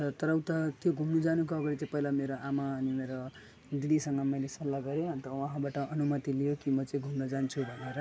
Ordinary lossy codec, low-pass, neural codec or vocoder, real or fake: none; none; none; real